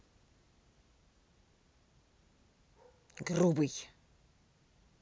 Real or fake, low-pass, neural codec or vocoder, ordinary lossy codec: real; none; none; none